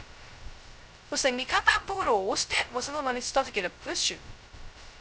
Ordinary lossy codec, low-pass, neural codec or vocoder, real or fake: none; none; codec, 16 kHz, 0.2 kbps, FocalCodec; fake